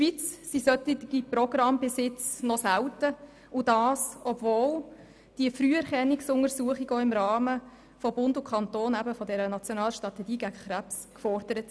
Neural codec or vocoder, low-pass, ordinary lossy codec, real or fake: none; none; none; real